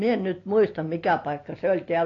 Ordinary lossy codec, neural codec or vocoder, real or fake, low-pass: AAC, 32 kbps; none; real; 7.2 kHz